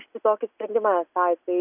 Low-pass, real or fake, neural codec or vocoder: 3.6 kHz; real; none